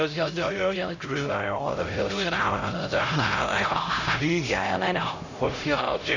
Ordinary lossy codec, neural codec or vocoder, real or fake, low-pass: none; codec, 16 kHz, 0.5 kbps, X-Codec, HuBERT features, trained on LibriSpeech; fake; 7.2 kHz